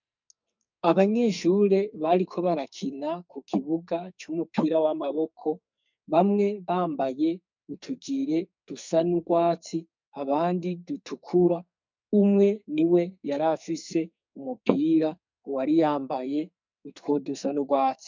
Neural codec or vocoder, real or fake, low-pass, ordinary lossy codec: codec, 44.1 kHz, 2.6 kbps, SNAC; fake; 7.2 kHz; MP3, 64 kbps